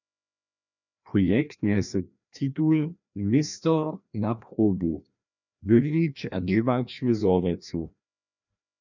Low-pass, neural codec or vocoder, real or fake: 7.2 kHz; codec, 16 kHz, 1 kbps, FreqCodec, larger model; fake